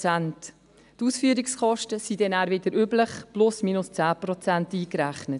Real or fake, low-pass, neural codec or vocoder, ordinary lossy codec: real; 10.8 kHz; none; none